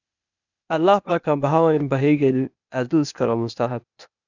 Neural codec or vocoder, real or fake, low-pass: codec, 16 kHz, 0.8 kbps, ZipCodec; fake; 7.2 kHz